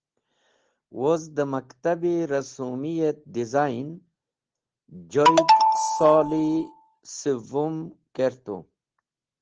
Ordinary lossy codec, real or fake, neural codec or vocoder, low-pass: Opus, 16 kbps; real; none; 7.2 kHz